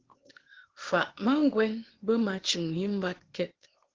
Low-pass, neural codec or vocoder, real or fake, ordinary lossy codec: 7.2 kHz; codec, 16 kHz, 0.8 kbps, ZipCodec; fake; Opus, 16 kbps